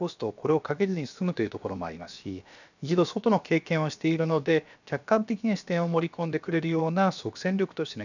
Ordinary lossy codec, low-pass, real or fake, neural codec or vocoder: none; 7.2 kHz; fake; codec, 16 kHz, about 1 kbps, DyCAST, with the encoder's durations